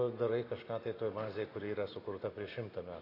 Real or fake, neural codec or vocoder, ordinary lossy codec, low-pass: real; none; AAC, 24 kbps; 5.4 kHz